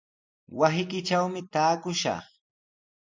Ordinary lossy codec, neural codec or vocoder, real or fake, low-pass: MP3, 64 kbps; none; real; 7.2 kHz